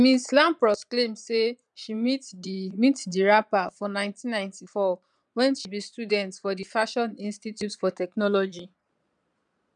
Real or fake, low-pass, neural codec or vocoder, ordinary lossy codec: fake; 9.9 kHz; vocoder, 22.05 kHz, 80 mel bands, Vocos; none